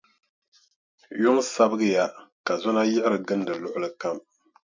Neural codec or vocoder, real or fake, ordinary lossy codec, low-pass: none; real; MP3, 64 kbps; 7.2 kHz